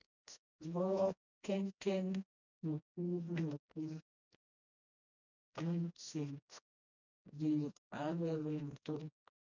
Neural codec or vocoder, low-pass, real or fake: codec, 16 kHz, 1 kbps, FreqCodec, smaller model; 7.2 kHz; fake